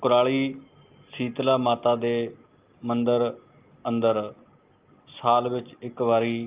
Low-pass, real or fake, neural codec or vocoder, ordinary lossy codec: 3.6 kHz; real; none; Opus, 24 kbps